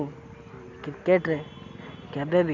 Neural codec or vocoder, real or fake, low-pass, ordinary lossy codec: none; real; 7.2 kHz; none